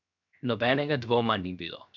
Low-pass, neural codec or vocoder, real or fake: 7.2 kHz; codec, 16 kHz, 0.7 kbps, FocalCodec; fake